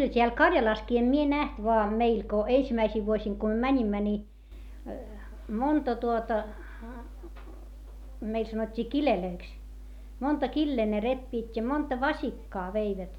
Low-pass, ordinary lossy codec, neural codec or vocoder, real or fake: 19.8 kHz; none; none; real